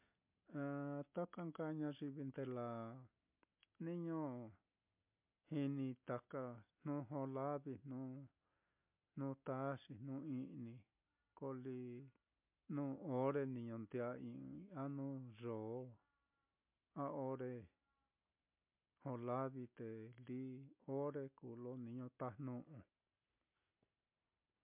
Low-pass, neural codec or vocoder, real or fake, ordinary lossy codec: 3.6 kHz; none; real; MP3, 32 kbps